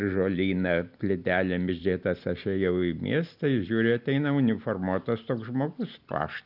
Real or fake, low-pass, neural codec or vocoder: real; 5.4 kHz; none